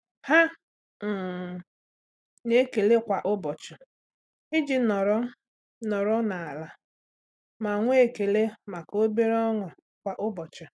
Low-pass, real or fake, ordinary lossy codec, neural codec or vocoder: none; real; none; none